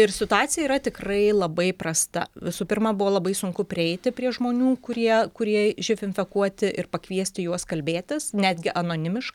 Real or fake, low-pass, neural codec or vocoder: real; 19.8 kHz; none